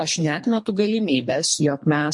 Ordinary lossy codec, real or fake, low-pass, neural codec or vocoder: MP3, 48 kbps; fake; 10.8 kHz; codec, 24 kHz, 3 kbps, HILCodec